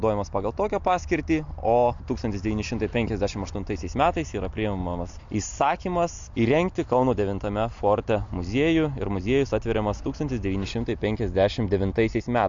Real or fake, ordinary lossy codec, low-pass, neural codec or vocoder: real; AAC, 64 kbps; 7.2 kHz; none